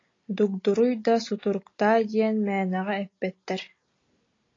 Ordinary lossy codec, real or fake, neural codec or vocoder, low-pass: AAC, 32 kbps; real; none; 7.2 kHz